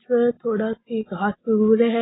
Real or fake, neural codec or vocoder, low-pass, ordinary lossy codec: real; none; 7.2 kHz; AAC, 16 kbps